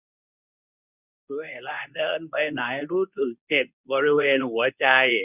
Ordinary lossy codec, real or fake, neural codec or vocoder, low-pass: none; fake; codec, 24 kHz, 0.9 kbps, WavTokenizer, medium speech release version 2; 3.6 kHz